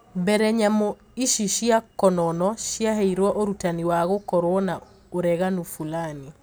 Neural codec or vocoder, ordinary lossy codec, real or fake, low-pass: none; none; real; none